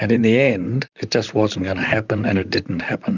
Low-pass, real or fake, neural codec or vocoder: 7.2 kHz; fake; vocoder, 44.1 kHz, 128 mel bands, Pupu-Vocoder